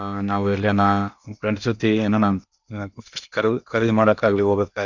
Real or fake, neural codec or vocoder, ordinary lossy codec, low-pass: fake; codec, 16 kHz in and 24 kHz out, 0.8 kbps, FocalCodec, streaming, 65536 codes; none; 7.2 kHz